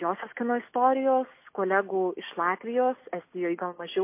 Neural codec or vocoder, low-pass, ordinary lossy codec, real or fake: none; 3.6 kHz; MP3, 24 kbps; real